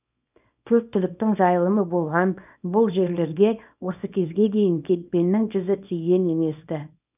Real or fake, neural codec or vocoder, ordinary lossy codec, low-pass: fake; codec, 24 kHz, 0.9 kbps, WavTokenizer, small release; none; 3.6 kHz